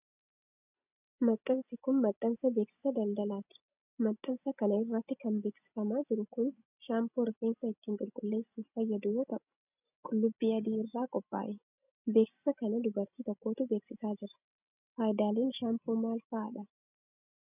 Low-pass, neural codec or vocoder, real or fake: 3.6 kHz; none; real